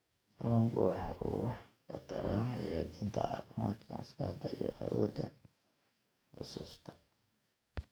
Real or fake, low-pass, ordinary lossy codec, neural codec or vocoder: fake; none; none; codec, 44.1 kHz, 2.6 kbps, DAC